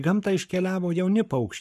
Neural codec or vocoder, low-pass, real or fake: codec, 44.1 kHz, 7.8 kbps, Pupu-Codec; 14.4 kHz; fake